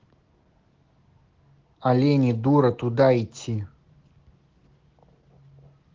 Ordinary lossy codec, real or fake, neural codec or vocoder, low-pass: Opus, 16 kbps; real; none; 7.2 kHz